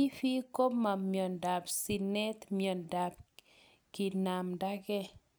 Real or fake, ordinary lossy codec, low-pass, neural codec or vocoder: real; none; none; none